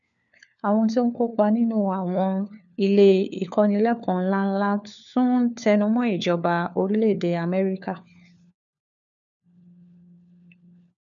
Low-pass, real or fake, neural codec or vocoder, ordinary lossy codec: 7.2 kHz; fake; codec, 16 kHz, 4 kbps, FunCodec, trained on LibriTTS, 50 frames a second; none